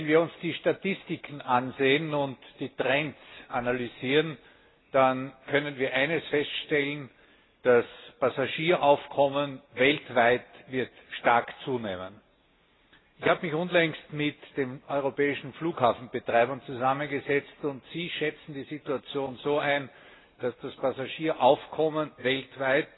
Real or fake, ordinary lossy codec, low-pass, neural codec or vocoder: real; AAC, 16 kbps; 7.2 kHz; none